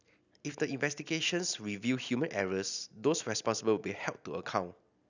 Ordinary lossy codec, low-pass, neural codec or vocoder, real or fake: none; 7.2 kHz; none; real